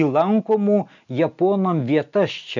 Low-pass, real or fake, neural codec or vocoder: 7.2 kHz; real; none